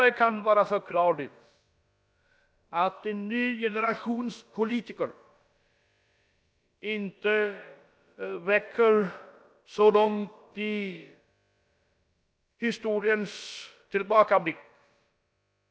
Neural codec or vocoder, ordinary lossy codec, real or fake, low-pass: codec, 16 kHz, about 1 kbps, DyCAST, with the encoder's durations; none; fake; none